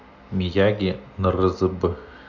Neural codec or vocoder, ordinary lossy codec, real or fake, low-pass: none; none; real; 7.2 kHz